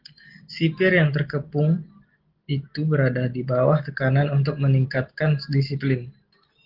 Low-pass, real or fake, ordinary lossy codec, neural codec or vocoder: 5.4 kHz; real; Opus, 16 kbps; none